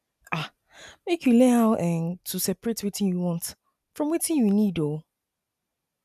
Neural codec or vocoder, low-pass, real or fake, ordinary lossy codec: none; 14.4 kHz; real; none